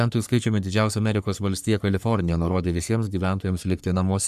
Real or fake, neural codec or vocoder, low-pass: fake; codec, 44.1 kHz, 3.4 kbps, Pupu-Codec; 14.4 kHz